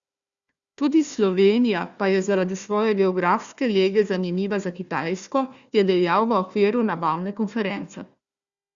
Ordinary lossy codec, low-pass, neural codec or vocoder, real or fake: Opus, 64 kbps; 7.2 kHz; codec, 16 kHz, 1 kbps, FunCodec, trained on Chinese and English, 50 frames a second; fake